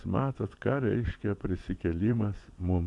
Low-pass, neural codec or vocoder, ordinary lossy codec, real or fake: 10.8 kHz; vocoder, 24 kHz, 100 mel bands, Vocos; AAC, 64 kbps; fake